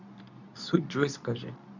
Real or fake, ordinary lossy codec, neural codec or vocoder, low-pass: fake; none; codec, 24 kHz, 0.9 kbps, WavTokenizer, medium speech release version 2; 7.2 kHz